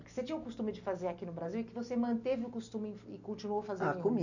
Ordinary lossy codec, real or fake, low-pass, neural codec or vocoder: none; real; 7.2 kHz; none